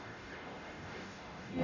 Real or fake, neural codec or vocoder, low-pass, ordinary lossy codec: fake; codec, 44.1 kHz, 0.9 kbps, DAC; 7.2 kHz; none